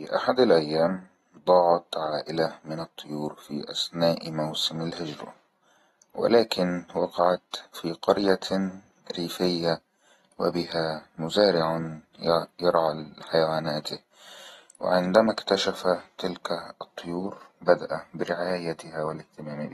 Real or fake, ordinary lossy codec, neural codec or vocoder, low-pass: real; AAC, 32 kbps; none; 19.8 kHz